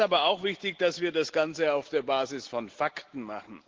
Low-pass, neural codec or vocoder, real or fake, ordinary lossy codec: 7.2 kHz; none; real; Opus, 16 kbps